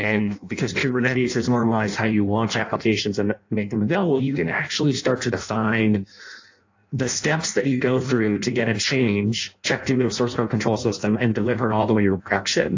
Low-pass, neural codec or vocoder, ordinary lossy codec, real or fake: 7.2 kHz; codec, 16 kHz in and 24 kHz out, 0.6 kbps, FireRedTTS-2 codec; AAC, 48 kbps; fake